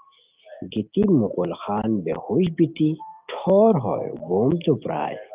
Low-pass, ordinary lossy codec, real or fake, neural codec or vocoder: 3.6 kHz; Opus, 32 kbps; real; none